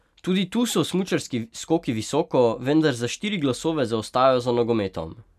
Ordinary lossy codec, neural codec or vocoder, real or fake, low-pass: none; none; real; 14.4 kHz